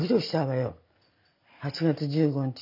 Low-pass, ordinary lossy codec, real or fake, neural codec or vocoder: 5.4 kHz; none; real; none